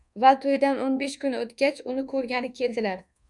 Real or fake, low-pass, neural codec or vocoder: fake; 10.8 kHz; codec, 24 kHz, 1.2 kbps, DualCodec